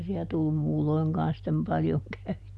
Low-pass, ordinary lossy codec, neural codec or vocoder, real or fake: none; none; none; real